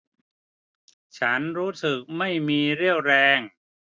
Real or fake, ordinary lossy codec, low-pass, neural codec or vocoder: real; none; none; none